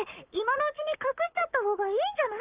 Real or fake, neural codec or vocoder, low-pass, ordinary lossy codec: fake; codec, 44.1 kHz, 7.8 kbps, Pupu-Codec; 3.6 kHz; Opus, 24 kbps